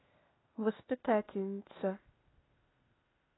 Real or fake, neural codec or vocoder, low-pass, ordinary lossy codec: fake; codec, 16 kHz, 0.8 kbps, ZipCodec; 7.2 kHz; AAC, 16 kbps